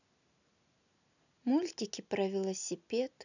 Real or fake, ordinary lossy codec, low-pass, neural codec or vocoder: real; none; 7.2 kHz; none